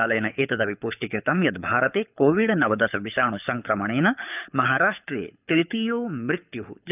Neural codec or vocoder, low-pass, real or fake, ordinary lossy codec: codec, 24 kHz, 6 kbps, HILCodec; 3.6 kHz; fake; none